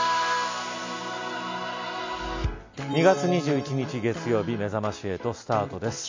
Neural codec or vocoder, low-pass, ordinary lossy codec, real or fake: none; 7.2 kHz; MP3, 48 kbps; real